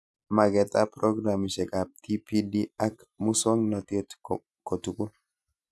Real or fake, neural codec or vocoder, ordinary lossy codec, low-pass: real; none; none; none